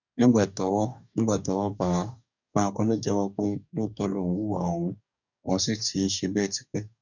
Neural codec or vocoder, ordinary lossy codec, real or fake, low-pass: codec, 44.1 kHz, 2.6 kbps, DAC; none; fake; 7.2 kHz